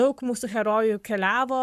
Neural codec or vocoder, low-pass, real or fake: codec, 44.1 kHz, 7.8 kbps, Pupu-Codec; 14.4 kHz; fake